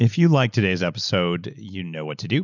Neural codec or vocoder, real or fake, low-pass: none; real; 7.2 kHz